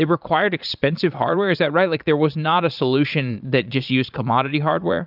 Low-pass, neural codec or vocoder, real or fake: 5.4 kHz; vocoder, 22.05 kHz, 80 mel bands, Vocos; fake